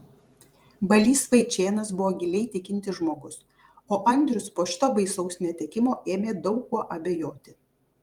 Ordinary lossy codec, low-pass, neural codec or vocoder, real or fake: Opus, 32 kbps; 19.8 kHz; vocoder, 44.1 kHz, 128 mel bands every 512 samples, BigVGAN v2; fake